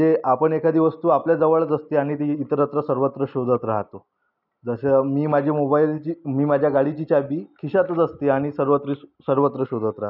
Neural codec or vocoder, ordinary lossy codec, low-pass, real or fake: none; none; 5.4 kHz; real